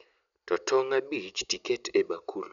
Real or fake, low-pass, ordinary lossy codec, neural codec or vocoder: real; 7.2 kHz; none; none